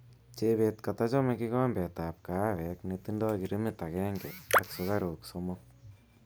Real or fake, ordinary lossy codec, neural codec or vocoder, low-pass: real; none; none; none